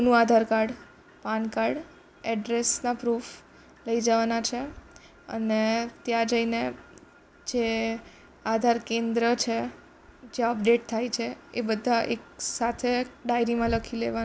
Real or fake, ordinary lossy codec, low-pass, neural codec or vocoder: real; none; none; none